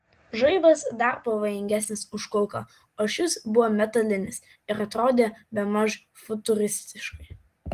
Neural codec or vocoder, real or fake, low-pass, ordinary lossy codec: none; real; 14.4 kHz; Opus, 24 kbps